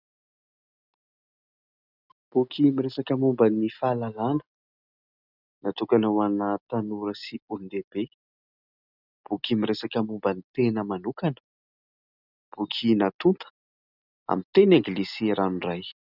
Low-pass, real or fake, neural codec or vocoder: 5.4 kHz; real; none